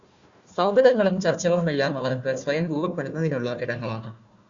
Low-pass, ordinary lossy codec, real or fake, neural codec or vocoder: 7.2 kHz; Opus, 64 kbps; fake; codec, 16 kHz, 1 kbps, FunCodec, trained on Chinese and English, 50 frames a second